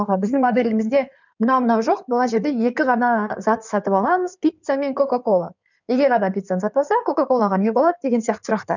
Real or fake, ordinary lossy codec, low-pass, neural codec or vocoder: fake; none; 7.2 kHz; codec, 16 kHz in and 24 kHz out, 2.2 kbps, FireRedTTS-2 codec